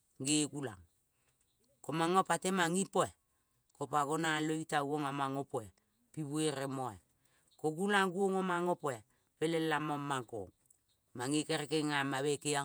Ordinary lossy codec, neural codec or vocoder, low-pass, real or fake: none; vocoder, 48 kHz, 128 mel bands, Vocos; none; fake